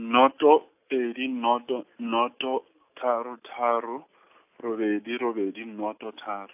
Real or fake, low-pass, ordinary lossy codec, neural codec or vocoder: real; 3.6 kHz; AAC, 32 kbps; none